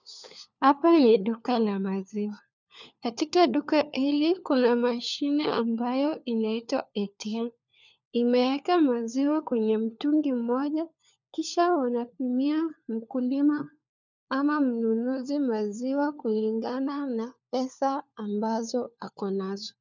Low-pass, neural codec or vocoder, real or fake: 7.2 kHz; codec, 16 kHz, 4 kbps, FunCodec, trained on LibriTTS, 50 frames a second; fake